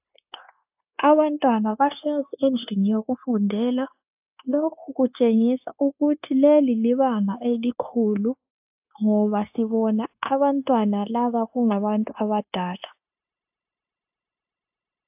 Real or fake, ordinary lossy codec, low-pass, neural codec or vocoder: fake; AAC, 32 kbps; 3.6 kHz; codec, 16 kHz, 0.9 kbps, LongCat-Audio-Codec